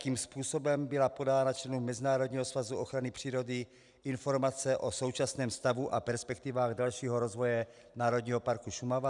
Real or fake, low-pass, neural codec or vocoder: real; 10.8 kHz; none